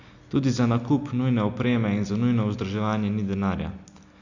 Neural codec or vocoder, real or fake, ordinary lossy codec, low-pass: none; real; none; 7.2 kHz